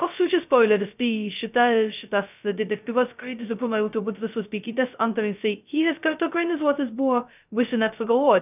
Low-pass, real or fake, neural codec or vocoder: 3.6 kHz; fake; codec, 16 kHz, 0.2 kbps, FocalCodec